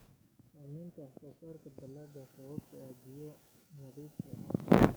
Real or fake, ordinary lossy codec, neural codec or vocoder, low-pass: fake; none; codec, 44.1 kHz, 7.8 kbps, DAC; none